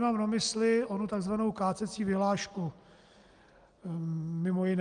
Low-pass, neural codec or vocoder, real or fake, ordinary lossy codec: 9.9 kHz; none; real; Opus, 32 kbps